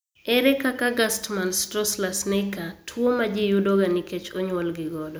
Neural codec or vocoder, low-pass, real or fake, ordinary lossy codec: none; none; real; none